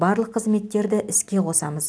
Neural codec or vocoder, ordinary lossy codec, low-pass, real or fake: none; none; none; real